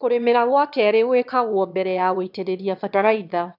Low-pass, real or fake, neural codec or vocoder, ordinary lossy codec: 5.4 kHz; fake; autoencoder, 22.05 kHz, a latent of 192 numbers a frame, VITS, trained on one speaker; none